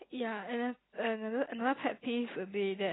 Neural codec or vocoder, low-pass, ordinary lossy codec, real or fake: none; 7.2 kHz; AAC, 16 kbps; real